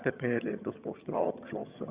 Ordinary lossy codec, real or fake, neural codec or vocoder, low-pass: Opus, 64 kbps; fake; vocoder, 22.05 kHz, 80 mel bands, HiFi-GAN; 3.6 kHz